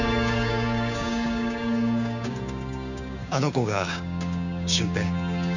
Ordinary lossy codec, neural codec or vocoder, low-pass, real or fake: none; codec, 16 kHz, 6 kbps, DAC; 7.2 kHz; fake